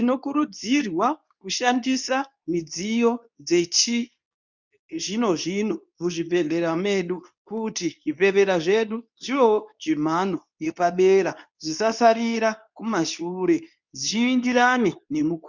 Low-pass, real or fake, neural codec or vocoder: 7.2 kHz; fake; codec, 24 kHz, 0.9 kbps, WavTokenizer, medium speech release version 2